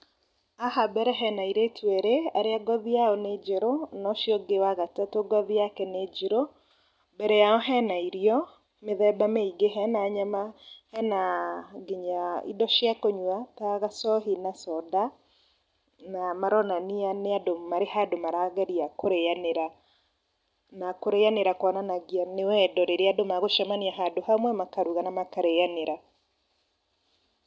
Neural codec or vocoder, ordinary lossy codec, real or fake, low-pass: none; none; real; none